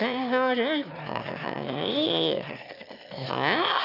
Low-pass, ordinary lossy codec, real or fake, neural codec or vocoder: 5.4 kHz; none; fake; autoencoder, 22.05 kHz, a latent of 192 numbers a frame, VITS, trained on one speaker